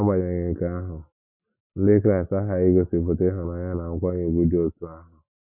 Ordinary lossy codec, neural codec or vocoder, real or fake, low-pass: Opus, 64 kbps; none; real; 3.6 kHz